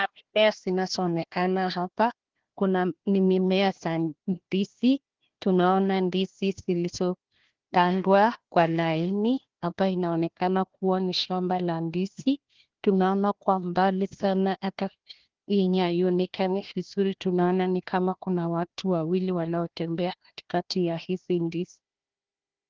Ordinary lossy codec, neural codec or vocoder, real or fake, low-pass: Opus, 16 kbps; codec, 16 kHz, 1 kbps, FunCodec, trained on Chinese and English, 50 frames a second; fake; 7.2 kHz